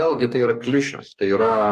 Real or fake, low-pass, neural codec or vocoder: fake; 14.4 kHz; codec, 44.1 kHz, 2.6 kbps, DAC